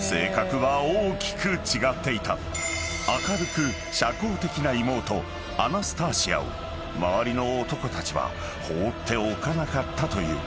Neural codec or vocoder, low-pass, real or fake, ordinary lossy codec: none; none; real; none